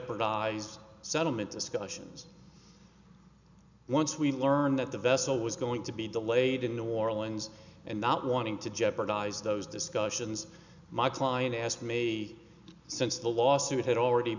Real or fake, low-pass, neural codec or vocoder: real; 7.2 kHz; none